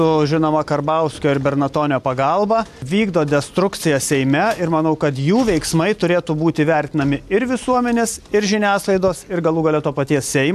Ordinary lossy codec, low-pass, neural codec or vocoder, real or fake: AAC, 96 kbps; 14.4 kHz; none; real